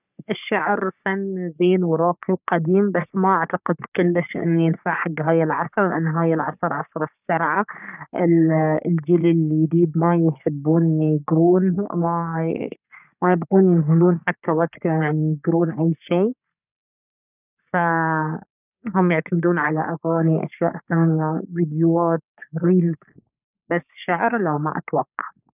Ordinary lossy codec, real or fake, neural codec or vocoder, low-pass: none; fake; codec, 44.1 kHz, 3.4 kbps, Pupu-Codec; 3.6 kHz